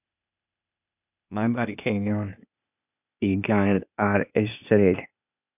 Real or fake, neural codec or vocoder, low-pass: fake; codec, 16 kHz, 0.8 kbps, ZipCodec; 3.6 kHz